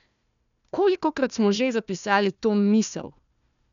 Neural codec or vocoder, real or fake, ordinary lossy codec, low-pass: codec, 16 kHz, 1 kbps, FunCodec, trained on Chinese and English, 50 frames a second; fake; none; 7.2 kHz